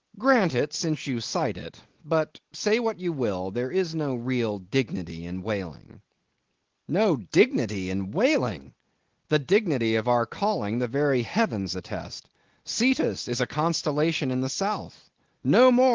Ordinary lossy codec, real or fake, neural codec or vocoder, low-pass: Opus, 16 kbps; real; none; 7.2 kHz